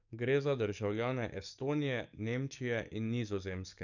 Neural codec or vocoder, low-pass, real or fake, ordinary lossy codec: codec, 44.1 kHz, 7.8 kbps, DAC; 7.2 kHz; fake; none